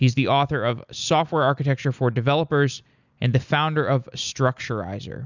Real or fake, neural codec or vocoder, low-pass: real; none; 7.2 kHz